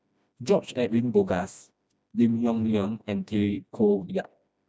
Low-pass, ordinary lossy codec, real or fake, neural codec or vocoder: none; none; fake; codec, 16 kHz, 1 kbps, FreqCodec, smaller model